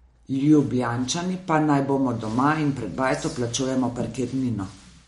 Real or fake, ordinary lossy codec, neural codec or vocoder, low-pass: real; MP3, 48 kbps; none; 19.8 kHz